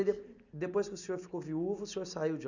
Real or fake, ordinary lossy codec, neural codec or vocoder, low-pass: real; none; none; 7.2 kHz